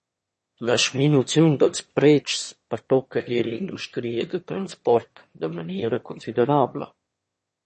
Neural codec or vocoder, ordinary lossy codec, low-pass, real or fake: autoencoder, 22.05 kHz, a latent of 192 numbers a frame, VITS, trained on one speaker; MP3, 32 kbps; 9.9 kHz; fake